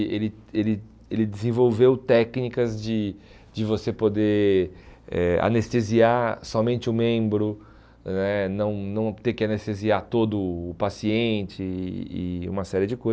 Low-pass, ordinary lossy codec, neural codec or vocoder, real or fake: none; none; none; real